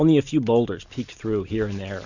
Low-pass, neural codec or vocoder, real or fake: 7.2 kHz; none; real